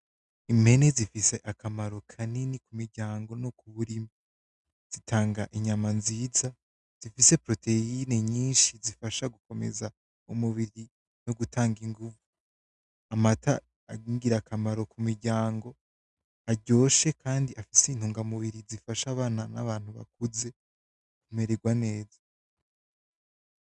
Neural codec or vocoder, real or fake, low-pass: none; real; 10.8 kHz